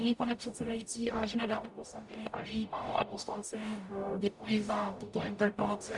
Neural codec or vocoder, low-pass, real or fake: codec, 44.1 kHz, 0.9 kbps, DAC; 10.8 kHz; fake